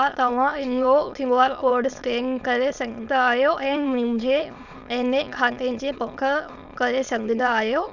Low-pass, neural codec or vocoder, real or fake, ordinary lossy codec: 7.2 kHz; autoencoder, 22.05 kHz, a latent of 192 numbers a frame, VITS, trained on many speakers; fake; Opus, 64 kbps